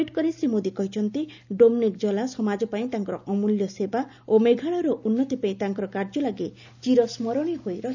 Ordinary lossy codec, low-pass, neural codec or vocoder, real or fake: none; 7.2 kHz; none; real